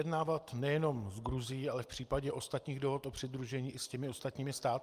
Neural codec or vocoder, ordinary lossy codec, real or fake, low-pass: none; Opus, 32 kbps; real; 14.4 kHz